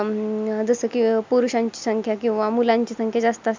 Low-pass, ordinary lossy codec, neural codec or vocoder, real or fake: 7.2 kHz; MP3, 64 kbps; none; real